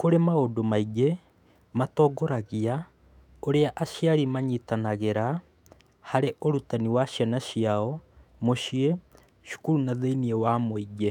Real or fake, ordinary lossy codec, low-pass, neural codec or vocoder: fake; none; 19.8 kHz; autoencoder, 48 kHz, 128 numbers a frame, DAC-VAE, trained on Japanese speech